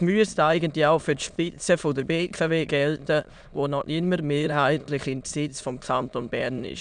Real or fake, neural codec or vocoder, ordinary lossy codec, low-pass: fake; autoencoder, 22.05 kHz, a latent of 192 numbers a frame, VITS, trained on many speakers; none; 9.9 kHz